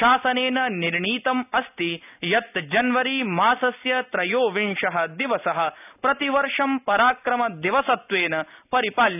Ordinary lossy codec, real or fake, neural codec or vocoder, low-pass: none; real; none; 3.6 kHz